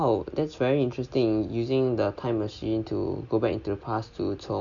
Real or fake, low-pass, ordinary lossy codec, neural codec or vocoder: real; 7.2 kHz; none; none